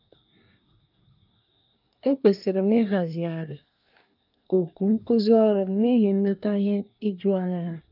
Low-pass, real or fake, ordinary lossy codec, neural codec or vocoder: 5.4 kHz; fake; none; codec, 24 kHz, 1 kbps, SNAC